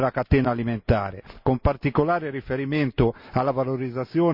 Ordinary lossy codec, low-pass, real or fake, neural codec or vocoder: none; 5.4 kHz; real; none